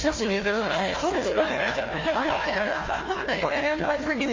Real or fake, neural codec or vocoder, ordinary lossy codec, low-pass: fake; codec, 16 kHz, 1 kbps, FunCodec, trained on Chinese and English, 50 frames a second; MP3, 32 kbps; 7.2 kHz